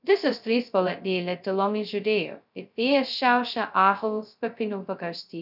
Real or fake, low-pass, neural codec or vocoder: fake; 5.4 kHz; codec, 16 kHz, 0.2 kbps, FocalCodec